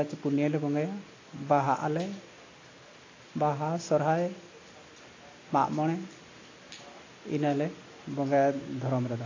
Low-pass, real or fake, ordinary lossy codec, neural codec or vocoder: 7.2 kHz; real; AAC, 32 kbps; none